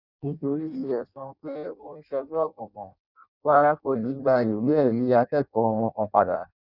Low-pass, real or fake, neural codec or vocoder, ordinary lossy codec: 5.4 kHz; fake; codec, 16 kHz in and 24 kHz out, 0.6 kbps, FireRedTTS-2 codec; none